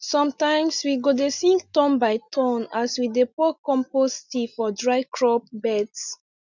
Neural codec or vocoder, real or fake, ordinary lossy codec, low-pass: none; real; none; 7.2 kHz